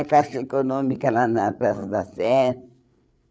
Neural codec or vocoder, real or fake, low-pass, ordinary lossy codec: codec, 16 kHz, 8 kbps, FreqCodec, larger model; fake; none; none